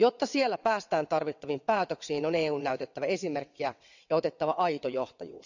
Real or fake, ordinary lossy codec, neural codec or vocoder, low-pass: fake; none; vocoder, 22.05 kHz, 80 mel bands, WaveNeXt; 7.2 kHz